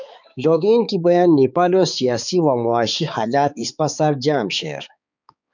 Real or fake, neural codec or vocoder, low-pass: fake; codec, 16 kHz, 4 kbps, X-Codec, HuBERT features, trained on balanced general audio; 7.2 kHz